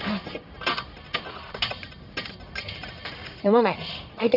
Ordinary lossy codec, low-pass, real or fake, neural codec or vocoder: none; 5.4 kHz; fake; codec, 44.1 kHz, 1.7 kbps, Pupu-Codec